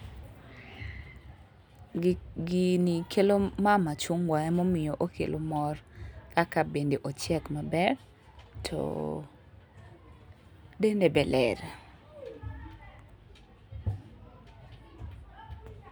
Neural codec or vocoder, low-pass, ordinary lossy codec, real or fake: none; none; none; real